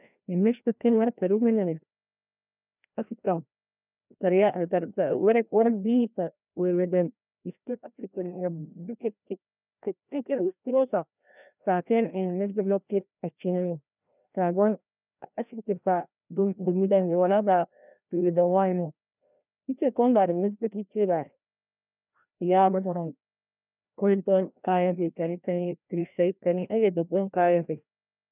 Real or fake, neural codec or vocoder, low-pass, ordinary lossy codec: fake; codec, 16 kHz, 1 kbps, FreqCodec, larger model; 3.6 kHz; none